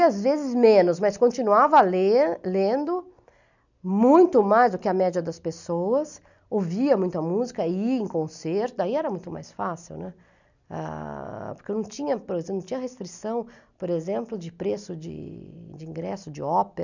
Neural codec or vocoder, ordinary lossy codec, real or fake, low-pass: none; none; real; 7.2 kHz